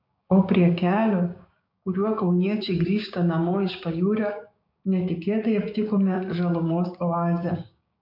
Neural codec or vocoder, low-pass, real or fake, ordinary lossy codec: codec, 16 kHz, 6 kbps, DAC; 5.4 kHz; fake; MP3, 48 kbps